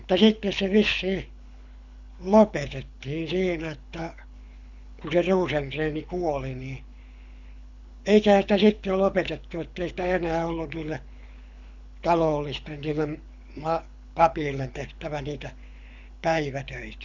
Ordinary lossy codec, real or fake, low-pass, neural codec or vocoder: none; fake; 7.2 kHz; codec, 24 kHz, 6 kbps, HILCodec